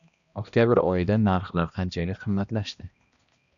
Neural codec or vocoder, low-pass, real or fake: codec, 16 kHz, 1 kbps, X-Codec, HuBERT features, trained on balanced general audio; 7.2 kHz; fake